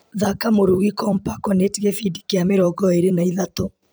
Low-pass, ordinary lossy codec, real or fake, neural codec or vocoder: none; none; fake; vocoder, 44.1 kHz, 128 mel bands every 256 samples, BigVGAN v2